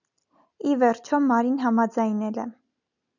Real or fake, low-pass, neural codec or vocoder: real; 7.2 kHz; none